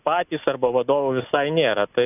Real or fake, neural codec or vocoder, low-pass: real; none; 3.6 kHz